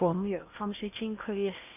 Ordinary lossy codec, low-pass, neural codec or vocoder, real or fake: none; 3.6 kHz; codec, 16 kHz in and 24 kHz out, 0.8 kbps, FocalCodec, streaming, 65536 codes; fake